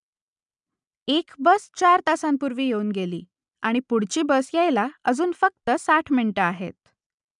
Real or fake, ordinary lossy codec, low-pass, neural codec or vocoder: real; none; 10.8 kHz; none